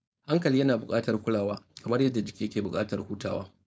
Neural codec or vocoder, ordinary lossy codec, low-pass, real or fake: codec, 16 kHz, 4.8 kbps, FACodec; none; none; fake